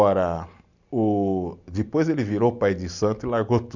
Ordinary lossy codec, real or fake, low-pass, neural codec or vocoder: none; real; 7.2 kHz; none